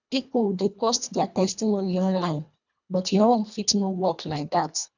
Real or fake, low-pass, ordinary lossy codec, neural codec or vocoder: fake; 7.2 kHz; none; codec, 24 kHz, 1.5 kbps, HILCodec